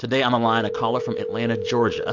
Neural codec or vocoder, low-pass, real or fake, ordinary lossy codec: none; 7.2 kHz; real; AAC, 48 kbps